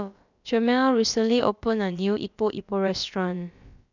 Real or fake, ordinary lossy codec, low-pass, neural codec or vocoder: fake; none; 7.2 kHz; codec, 16 kHz, about 1 kbps, DyCAST, with the encoder's durations